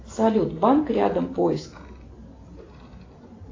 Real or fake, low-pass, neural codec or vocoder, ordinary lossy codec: real; 7.2 kHz; none; AAC, 32 kbps